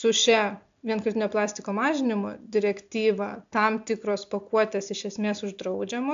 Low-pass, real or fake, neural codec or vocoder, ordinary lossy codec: 7.2 kHz; real; none; AAC, 64 kbps